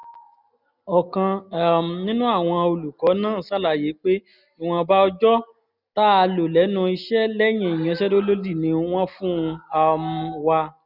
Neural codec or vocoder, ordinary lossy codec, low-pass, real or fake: none; none; 5.4 kHz; real